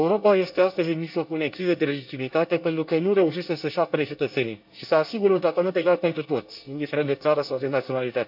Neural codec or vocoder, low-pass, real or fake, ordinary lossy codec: codec, 24 kHz, 1 kbps, SNAC; 5.4 kHz; fake; AAC, 48 kbps